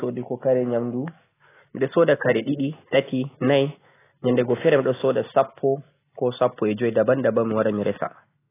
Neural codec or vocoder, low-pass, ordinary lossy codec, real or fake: none; 3.6 kHz; AAC, 16 kbps; real